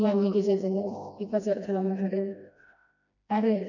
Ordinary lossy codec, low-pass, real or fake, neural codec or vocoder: none; 7.2 kHz; fake; codec, 16 kHz, 1 kbps, FreqCodec, smaller model